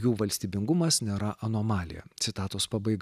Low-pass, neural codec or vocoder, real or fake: 14.4 kHz; none; real